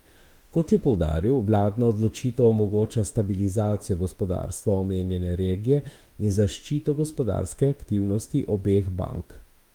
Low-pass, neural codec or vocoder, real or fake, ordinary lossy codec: 19.8 kHz; autoencoder, 48 kHz, 32 numbers a frame, DAC-VAE, trained on Japanese speech; fake; Opus, 32 kbps